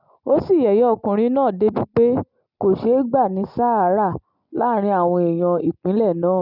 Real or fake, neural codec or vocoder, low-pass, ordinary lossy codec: real; none; 5.4 kHz; none